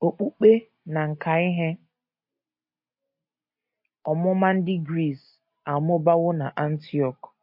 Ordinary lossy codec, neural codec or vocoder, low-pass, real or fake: MP3, 32 kbps; none; 5.4 kHz; real